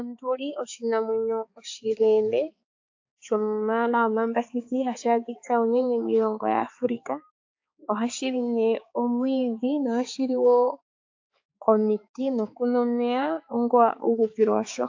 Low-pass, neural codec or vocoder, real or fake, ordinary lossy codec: 7.2 kHz; codec, 16 kHz, 4 kbps, X-Codec, HuBERT features, trained on balanced general audio; fake; AAC, 48 kbps